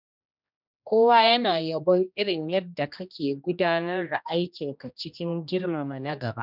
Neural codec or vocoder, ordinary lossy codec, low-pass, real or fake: codec, 16 kHz, 1 kbps, X-Codec, HuBERT features, trained on general audio; none; 5.4 kHz; fake